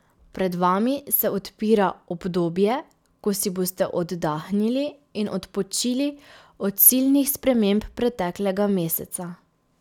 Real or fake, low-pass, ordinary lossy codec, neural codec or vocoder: real; 19.8 kHz; none; none